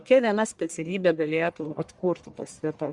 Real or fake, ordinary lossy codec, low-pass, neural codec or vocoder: fake; Opus, 64 kbps; 10.8 kHz; codec, 44.1 kHz, 1.7 kbps, Pupu-Codec